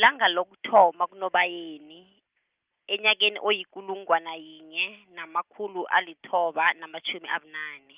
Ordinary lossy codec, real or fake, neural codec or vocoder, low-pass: Opus, 24 kbps; real; none; 3.6 kHz